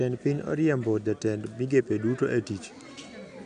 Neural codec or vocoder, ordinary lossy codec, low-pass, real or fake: none; none; 9.9 kHz; real